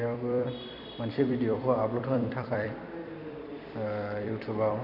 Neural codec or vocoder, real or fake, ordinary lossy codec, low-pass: vocoder, 44.1 kHz, 128 mel bands every 256 samples, BigVGAN v2; fake; none; 5.4 kHz